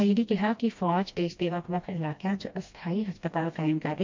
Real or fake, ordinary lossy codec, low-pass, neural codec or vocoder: fake; MP3, 48 kbps; 7.2 kHz; codec, 16 kHz, 1 kbps, FreqCodec, smaller model